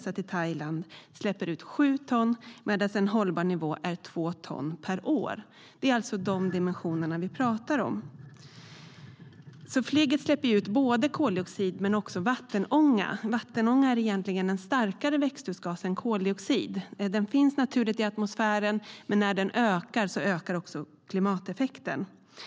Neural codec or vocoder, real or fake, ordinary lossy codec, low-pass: none; real; none; none